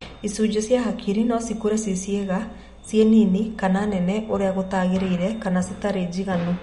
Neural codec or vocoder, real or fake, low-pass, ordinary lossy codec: none; real; 19.8 kHz; MP3, 48 kbps